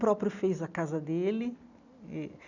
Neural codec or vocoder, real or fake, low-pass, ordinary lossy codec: none; real; 7.2 kHz; none